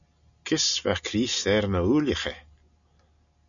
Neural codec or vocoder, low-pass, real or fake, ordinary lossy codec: none; 7.2 kHz; real; MP3, 96 kbps